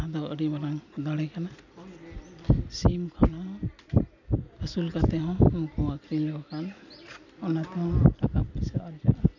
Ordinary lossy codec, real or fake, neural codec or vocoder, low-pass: none; real; none; 7.2 kHz